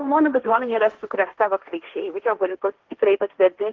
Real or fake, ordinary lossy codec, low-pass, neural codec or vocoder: fake; Opus, 16 kbps; 7.2 kHz; codec, 16 kHz, 1.1 kbps, Voila-Tokenizer